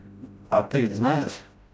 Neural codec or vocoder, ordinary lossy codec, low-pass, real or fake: codec, 16 kHz, 0.5 kbps, FreqCodec, smaller model; none; none; fake